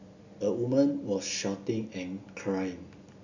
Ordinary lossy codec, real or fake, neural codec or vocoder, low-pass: none; real; none; 7.2 kHz